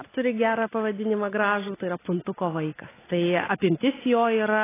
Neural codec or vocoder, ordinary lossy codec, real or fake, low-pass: none; AAC, 16 kbps; real; 3.6 kHz